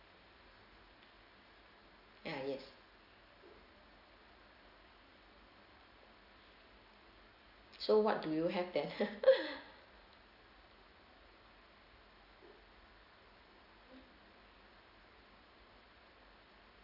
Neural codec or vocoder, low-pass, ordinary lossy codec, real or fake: none; 5.4 kHz; none; real